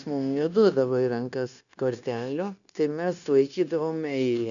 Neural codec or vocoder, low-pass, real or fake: codec, 16 kHz, 0.9 kbps, LongCat-Audio-Codec; 7.2 kHz; fake